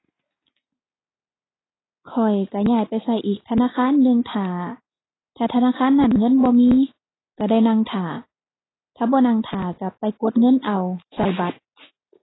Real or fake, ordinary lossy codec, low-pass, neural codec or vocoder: real; AAC, 16 kbps; 7.2 kHz; none